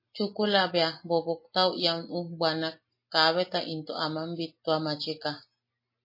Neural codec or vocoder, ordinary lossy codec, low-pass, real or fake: none; MP3, 24 kbps; 5.4 kHz; real